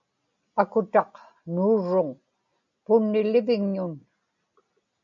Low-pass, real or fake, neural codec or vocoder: 7.2 kHz; real; none